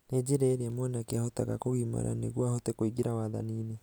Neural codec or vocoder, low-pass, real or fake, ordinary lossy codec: none; none; real; none